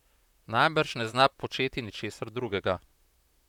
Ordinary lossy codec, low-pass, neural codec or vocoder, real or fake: none; 19.8 kHz; vocoder, 44.1 kHz, 128 mel bands, Pupu-Vocoder; fake